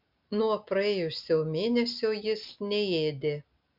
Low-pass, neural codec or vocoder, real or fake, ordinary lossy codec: 5.4 kHz; none; real; MP3, 48 kbps